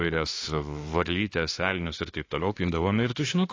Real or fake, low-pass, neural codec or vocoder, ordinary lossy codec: fake; 7.2 kHz; autoencoder, 48 kHz, 32 numbers a frame, DAC-VAE, trained on Japanese speech; AAC, 32 kbps